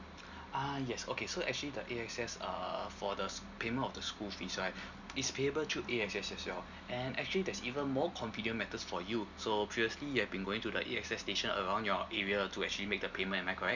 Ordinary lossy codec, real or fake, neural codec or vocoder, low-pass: none; real; none; 7.2 kHz